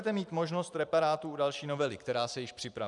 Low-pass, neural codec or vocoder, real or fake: 10.8 kHz; vocoder, 44.1 kHz, 128 mel bands every 512 samples, BigVGAN v2; fake